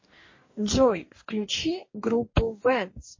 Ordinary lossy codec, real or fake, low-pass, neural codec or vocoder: MP3, 32 kbps; fake; 7.2 kHz; codec, 44.1 kHz, 2.6 kbps, DAC